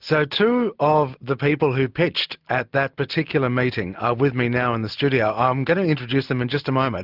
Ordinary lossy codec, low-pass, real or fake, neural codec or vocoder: Opus, 32 kbps; 5.4 kHz; real; none